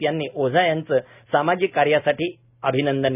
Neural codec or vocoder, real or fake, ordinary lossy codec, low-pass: none; real; none; 3.6 kHz